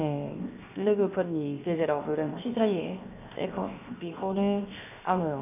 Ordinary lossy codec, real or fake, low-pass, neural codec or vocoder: none; fake; 3.6 kHz; codec, 16 kHz, 0.7 kbps, FocalCodec